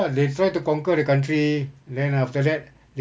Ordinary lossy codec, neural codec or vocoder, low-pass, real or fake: none; none; none; real